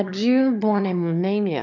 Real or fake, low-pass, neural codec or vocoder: fake; 7.2 kHz; autoencoder, 22.05 kHz, a latent of 192 numbers a frame, VITS, trained on one speaker